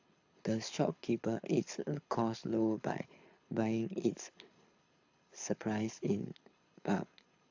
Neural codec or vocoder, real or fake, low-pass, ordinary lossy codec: codec, 24 kHz, 6 kbps, HILCodec; fake; 7.2 kHz; AAC, 48 kbps